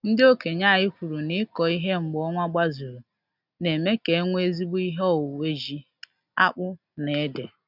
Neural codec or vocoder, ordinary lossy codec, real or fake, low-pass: none; none; real; 5.4 kHz